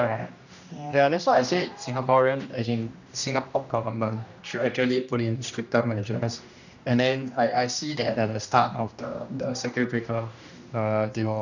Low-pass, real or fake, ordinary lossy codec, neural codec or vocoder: 7.2 kHz; fake; none; codec, 16 kHz, 1 kbps, X-Codec, HuBERT features, trained on general audio